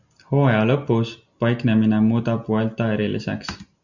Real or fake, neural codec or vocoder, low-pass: real; none; 7.2 kHz